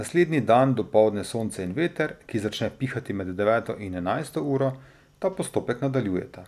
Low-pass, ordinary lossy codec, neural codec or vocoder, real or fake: 14.4 kHz; none; none; real